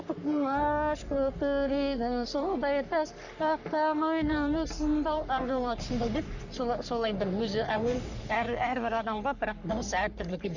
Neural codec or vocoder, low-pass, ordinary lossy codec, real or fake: codec, 44.1 kHz, 3.4 kbps, Pupu-Codec; 7.2 kHz; none; fake